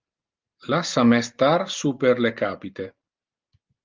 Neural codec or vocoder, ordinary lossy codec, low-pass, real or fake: none; Opus, 32 kbps; 7.2 kHz; real